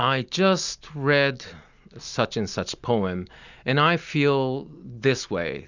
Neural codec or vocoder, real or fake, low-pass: none; real; 7.2 kHz